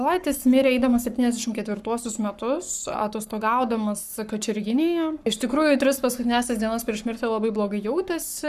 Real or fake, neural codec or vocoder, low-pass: fake; codec, 44.1 kHz, 7.8 kbps, Pupu-Codec; 14.4 kHz